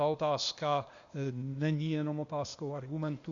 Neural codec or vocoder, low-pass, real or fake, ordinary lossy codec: codec, 16 kHz, 0.8 kbps, ZipCodec; 7.2 kHz; fake; Opus, 64 kbps